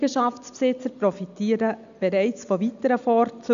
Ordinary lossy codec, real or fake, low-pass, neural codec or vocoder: none; real; 7.2 kHz; none